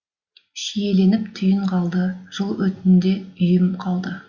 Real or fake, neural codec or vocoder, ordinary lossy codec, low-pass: real; none; Opus, 64 kbps; 7.2 kHz